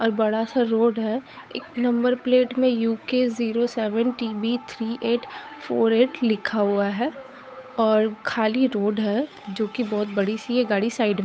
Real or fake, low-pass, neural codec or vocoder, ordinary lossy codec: fake; none; codec, 16 kHz, 8 kbps, FunCodec, trained on Chinese and English, 25 frames a second; none